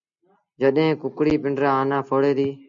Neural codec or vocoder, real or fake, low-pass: none; real; 7.2 kHz